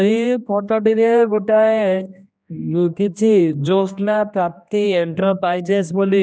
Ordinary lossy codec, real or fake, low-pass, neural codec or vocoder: none; fake; none; codec, 16 kHz, 1 kbps, X-Codec, HuBERT features, trained on general audio